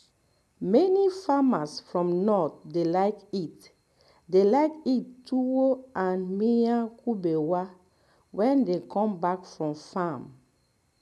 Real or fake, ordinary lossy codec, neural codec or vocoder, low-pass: real; none; none; none